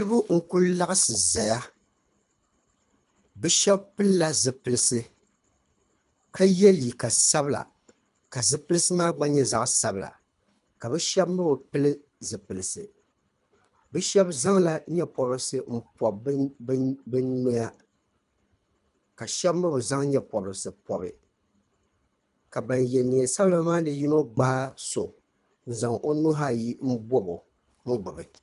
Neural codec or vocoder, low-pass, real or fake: codec, 24 kHz, 3 kbps, HILCodec; 10.8 kHz; fake